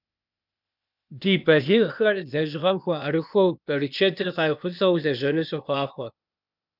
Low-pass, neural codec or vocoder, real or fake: 5.4 kHz; codec, 16 kHz, 0.8 kbps, ZipCodec; fake